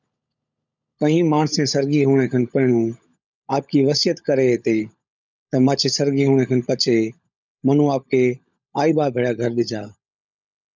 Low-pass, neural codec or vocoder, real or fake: 7.2 kHz; codec, 16 kHz, 16 kbps, FunCodec, trained on LibriTTS, 50 frames a second; fake